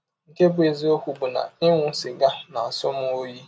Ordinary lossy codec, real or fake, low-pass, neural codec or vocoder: none; real; none; none